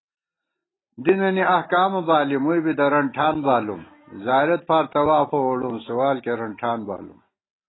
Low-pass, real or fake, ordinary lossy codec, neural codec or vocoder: 7.2 kHz; real; AAC, 16 kbps; none